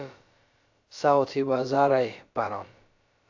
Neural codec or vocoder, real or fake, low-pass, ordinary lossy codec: codec, 16 kHz, about 1 kbps, DyCAST, with the encoder's durations; fake; 7.2 kHz; AAC, 48 kbps